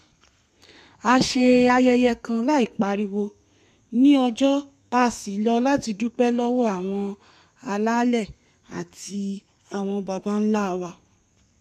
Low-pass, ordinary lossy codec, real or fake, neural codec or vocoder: 14.4 kHz; none; fake; codec, 32 kHz, 1.9 kbps, SNAC